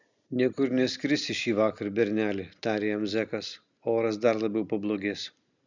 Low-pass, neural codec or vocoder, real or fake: 7.2 kHz; none; real